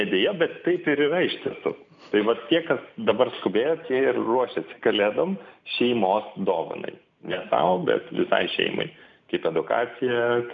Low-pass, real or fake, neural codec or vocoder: 7.2 kHz; real; none